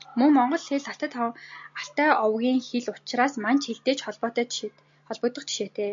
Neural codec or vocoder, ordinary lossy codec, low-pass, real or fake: none; MP3, 48 kbps; 7.2 kHz; real